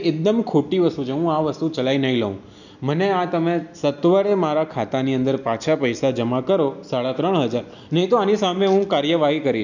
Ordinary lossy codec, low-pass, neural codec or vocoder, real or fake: none; 7.2 kHz; none; real